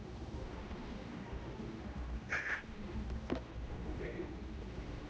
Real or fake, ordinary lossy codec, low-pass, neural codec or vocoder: fake; none; none; codec, 16 kHz, 1 kbps, X-Codec, HuBERT features, trained on general audio